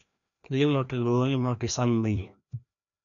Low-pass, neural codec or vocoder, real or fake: 7.2 kHz; codec, 16 kHz, 1 kbps, FreqCodec, larger model; fake